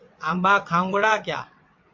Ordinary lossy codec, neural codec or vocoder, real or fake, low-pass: MP3, 48 kbps; vocoder, 22.05 kHz, 80 mel bands, WaveNeXt; fake; 7.2 kHz